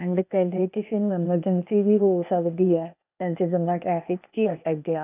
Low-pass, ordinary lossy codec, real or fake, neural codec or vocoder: 3.6 kHz; none; fake; codec, 16 kHz, 0.8 kbps, ZipCodec